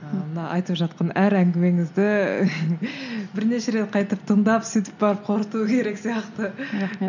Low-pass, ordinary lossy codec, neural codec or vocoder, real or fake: 7.2 kHz; none; none; real